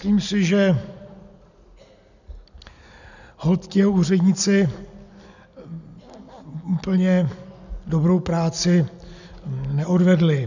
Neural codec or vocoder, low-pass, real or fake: none; 7.2 kHz; real